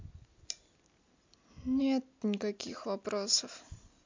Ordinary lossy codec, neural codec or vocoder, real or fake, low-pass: MP3, 64 kbps; none; real; 7.2 kHz